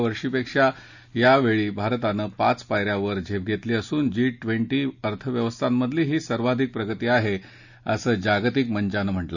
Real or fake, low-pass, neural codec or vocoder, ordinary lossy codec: real; 7.2 kHz; none; none